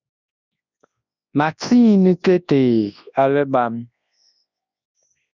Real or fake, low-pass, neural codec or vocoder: fake; 7.2 kHz; codec, 24 kHz, 0.9 kbps, WavTokenizer, large speech release